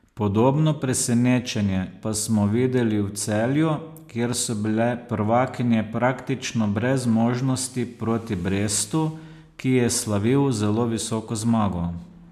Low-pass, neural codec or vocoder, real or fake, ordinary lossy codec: 14.4 kHz; none; real; MP3, 96 kbps